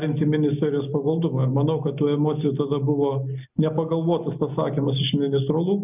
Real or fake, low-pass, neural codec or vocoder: real; 3.6 kHz; none